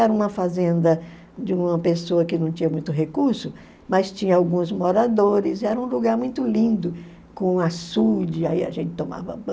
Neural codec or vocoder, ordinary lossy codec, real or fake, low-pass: none; none; real; none